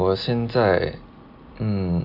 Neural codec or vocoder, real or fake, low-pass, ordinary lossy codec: none; real; 5.4 kHz; none